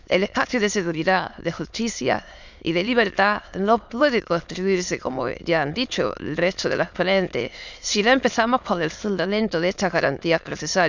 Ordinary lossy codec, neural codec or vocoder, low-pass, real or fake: none; autoencoder, 22.05 kHz, a latent of 192 numbers a frame, VITS, trained on many speakers; 7.2 kHz; fake